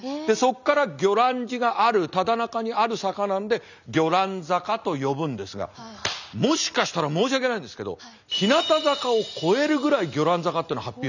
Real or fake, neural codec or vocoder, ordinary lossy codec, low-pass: real; none; none; 7.2 kHz